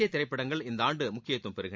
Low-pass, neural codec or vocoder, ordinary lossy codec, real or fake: none; none; none; real